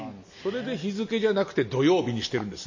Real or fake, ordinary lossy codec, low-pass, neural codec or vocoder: real; MP3, 32 kbps; 7.2 kHz; none